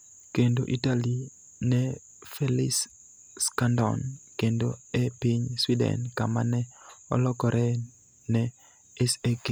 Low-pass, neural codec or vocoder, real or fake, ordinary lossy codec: none; none; real; none